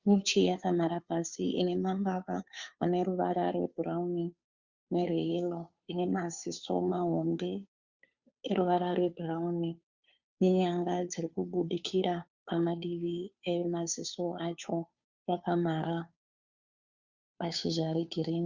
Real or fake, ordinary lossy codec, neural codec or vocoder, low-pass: fake; Opus, 64 kbps; codec, 16 kHz, 2 kbps, FunCodec, trained on Chinese and English, 25 frames a second; 7.2 kHz